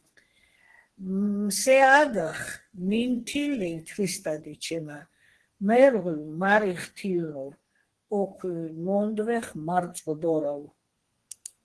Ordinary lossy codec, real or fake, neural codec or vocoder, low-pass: Opus, 16 kbps; fake; codec, 32 kHz, 1.9 kbps, SNAC; 10.8 kHz